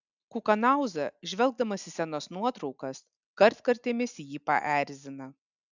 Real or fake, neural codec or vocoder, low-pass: real; none; 7.2 kHz